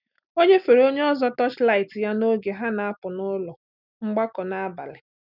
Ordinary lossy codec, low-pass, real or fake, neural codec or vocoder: none; 5.4 kHz; real; none